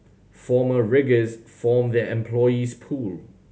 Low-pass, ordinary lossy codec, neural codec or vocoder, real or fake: none; none; none; real